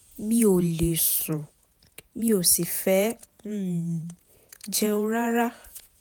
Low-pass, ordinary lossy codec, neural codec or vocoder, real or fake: none; none; vocoder, 48 kHz, 128 mel bands, Vocos; fake